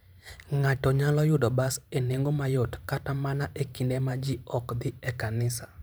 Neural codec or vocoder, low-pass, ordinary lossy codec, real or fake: vocoder, 44.1 kHz, 128 mel bands every 512 samples, BigVGAN v2; none; none; fake